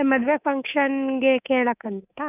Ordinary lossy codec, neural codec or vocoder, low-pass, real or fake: none; codec, 24 kHz, 3.1 kbps, DualCodec; 3.6 kHz; fake